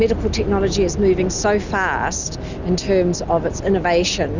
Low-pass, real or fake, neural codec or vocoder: 7.2 kHz; real; none